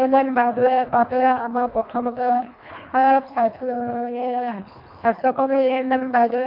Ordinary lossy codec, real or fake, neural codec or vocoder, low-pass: MP3, 48 kbps; fake; codec, 24 kHz, 1.5 kbps, HILCodec; 5.4 kHz